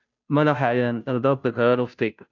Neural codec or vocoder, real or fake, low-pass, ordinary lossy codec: codec, 16 kHz, 0.5 kbps, FunCodec, trained on Chinese and English, 25 frames a second; fake; 7.2 kHz; none